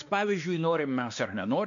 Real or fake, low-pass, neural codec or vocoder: fake; 7.2 kHz; codec, 16 kHz, 2 kbps, X-Codec, WavLM features, trained on Multilingual LibriSpeech